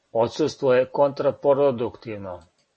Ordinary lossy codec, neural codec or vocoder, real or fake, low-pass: MP3, 32 kbps; none; real; 10.8 kHz